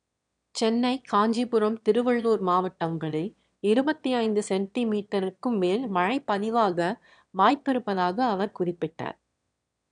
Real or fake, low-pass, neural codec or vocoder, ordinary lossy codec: fake; 9.9 kHz; autoencoder, 22.05 kHz, a latent of 192 numbers a frame, VITS, trained on one speaker; none